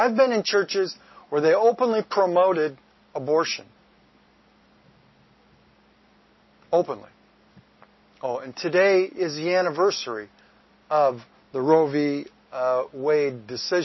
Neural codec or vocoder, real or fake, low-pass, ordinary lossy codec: none; real; 7.2 kHz; MP3, 24 kbps